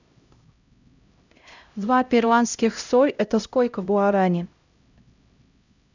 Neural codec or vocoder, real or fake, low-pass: codec, 16 kHz, 0.5 kbps, X-Codec, HuBERT features, trained on LibriSpeech; fake; 7.2 kHz